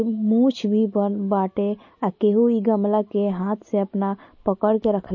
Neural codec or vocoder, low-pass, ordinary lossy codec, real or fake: none; 7.2 kHz; MP3, 32 kbps; real